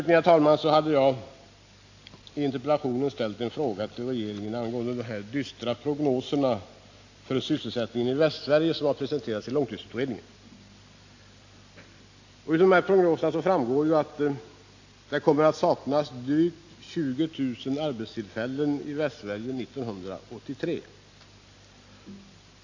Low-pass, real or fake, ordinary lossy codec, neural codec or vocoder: 7.2 kHz; real; AAC, 48 kbps; none